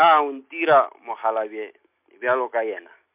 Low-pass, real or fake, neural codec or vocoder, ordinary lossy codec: 3.6 kHz; real; none; MP3, 32 kbps